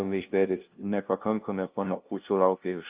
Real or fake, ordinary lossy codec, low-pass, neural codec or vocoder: fake; Opus, 24 kbps; 3.6 kHz; codec, 16 kHz, 0.5 kbps, FunCodec, trained on LibriTTS, 25 frames a second